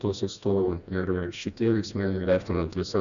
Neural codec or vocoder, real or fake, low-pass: codec, 16 kHz, 1 kbps, FreqCodec, smaller model; fake; 7.2 kHz